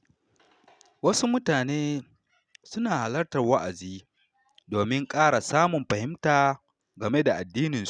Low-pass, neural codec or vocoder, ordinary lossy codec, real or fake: 9.9 kHz; none; none; real